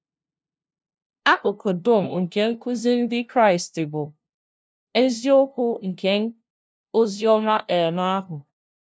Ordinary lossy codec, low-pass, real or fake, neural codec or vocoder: none; none; fake; codec, 16 kHz, 0.5 kbps, FunCodec, trained on LibriTTS, 25 frames a second